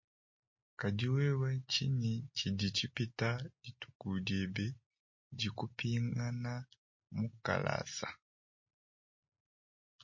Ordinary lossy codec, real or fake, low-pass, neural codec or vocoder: MP3, 32 kbps; real; 7.2 kHz; none